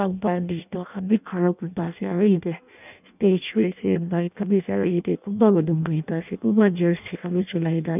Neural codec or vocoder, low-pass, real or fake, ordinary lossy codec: codec, 16 kHz in and 24 kHz out, 0.6 kbps, FireRedTTS-2 codec; 3.6 kHz; fake; none